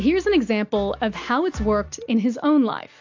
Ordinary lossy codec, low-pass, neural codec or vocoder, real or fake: AAC, 48 kbps; 7.2 kHz; none; real